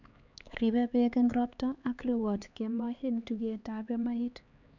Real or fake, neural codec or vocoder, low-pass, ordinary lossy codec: fake; codec, 16 kHz, 4 kbps, X-Codec, HuBERT features, trained on LibriSpeech; 7.2 kHz; none